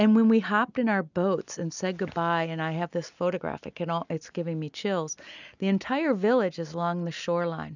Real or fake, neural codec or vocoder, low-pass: real; none; 7.2 kHz